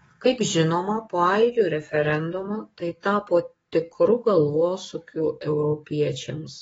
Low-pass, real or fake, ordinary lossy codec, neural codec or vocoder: 19.8 kHz; fake; AAC, 24 kbps; codec, 44.1 kHz, 7.8 kbps, DAC